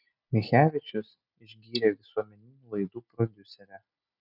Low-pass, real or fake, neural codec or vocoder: 5.4 kHz; real; none